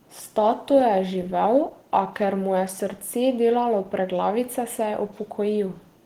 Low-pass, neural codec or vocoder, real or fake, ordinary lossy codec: 19.8 kHz; none; real; Opus, 16 kbps